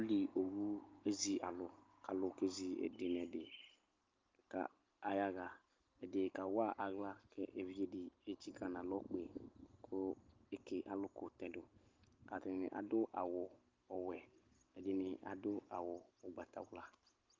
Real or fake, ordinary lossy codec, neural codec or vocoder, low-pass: real; Opus, 24 kbps; none; 7.2 kHz